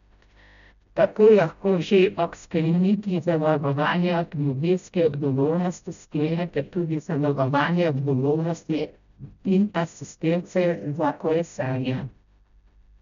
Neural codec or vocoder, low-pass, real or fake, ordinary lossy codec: codec, 16 kHz, 0.5 kbps, FreqCodec, smaller model; 7.2 kHz; fake; none